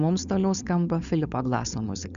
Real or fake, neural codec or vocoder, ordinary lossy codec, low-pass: fake; codec, 16 kHz, 4.8 kbps, FACodec; Opus, 64 kbps; 7.2 kHz